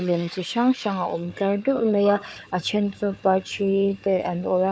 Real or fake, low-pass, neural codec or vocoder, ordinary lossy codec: fake; none; codec, 16 kHz, 4 kbps, FunCodec, trained on Chinese and English, 50 frames a second; none